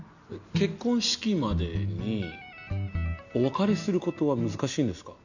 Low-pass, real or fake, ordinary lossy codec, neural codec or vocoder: 7.2 kHz; real; none; none